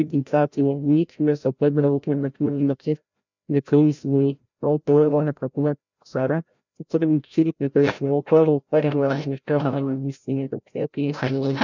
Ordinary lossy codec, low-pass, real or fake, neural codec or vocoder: none; 7.2 kHz; fake; codec, 16 kHz, 0.5 kbps, FreqCodec, larger model